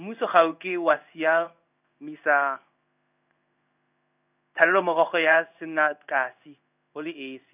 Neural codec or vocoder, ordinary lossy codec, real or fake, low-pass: codec, 16 kHz in and 24 kHz out, 1 kbps, XY-Tokenizer; none; fake; 3.6 kHz